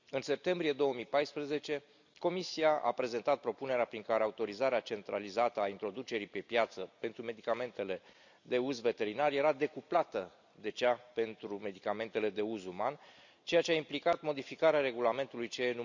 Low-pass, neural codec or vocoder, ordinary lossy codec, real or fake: 7.2 kHz; none; none; real